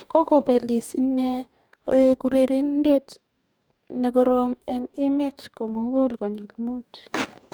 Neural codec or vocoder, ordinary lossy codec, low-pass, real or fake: codec, 44.1 kHz, 2.6 kbps, DAC; none; none; fake